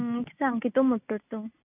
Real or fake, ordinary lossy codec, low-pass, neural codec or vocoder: real; none; 3.6 kHz; none